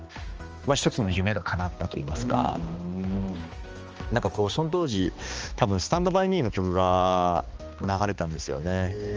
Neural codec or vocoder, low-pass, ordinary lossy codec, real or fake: codec, 16 kHz, 2 kbps, X-Codec, HuBERT features, trained on balanced general audio; 7.2 kHz; Opus, 24 kbps; fake